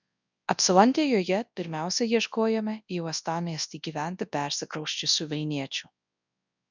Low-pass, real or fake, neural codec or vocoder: 7.2 kHz; fake; codec, 24 kHz, 0.9 kbps, WavTokenizer, large speech release